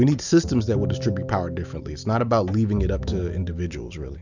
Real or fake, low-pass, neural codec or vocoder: real; 7.2 kHz; none